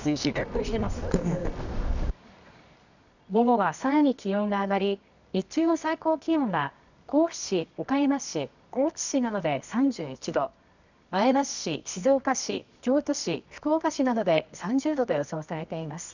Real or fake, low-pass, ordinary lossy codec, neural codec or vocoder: fake; 7.2 kHz; none; codec, 24 kHz, 0.9 kbps, WavTokenizer, medium music audio release